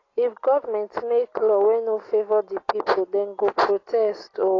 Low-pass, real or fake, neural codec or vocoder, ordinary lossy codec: 7.2 kHz; real; none; AAC, 32 kbps